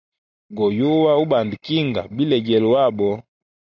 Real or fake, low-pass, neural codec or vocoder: real; 7.2 kHz; none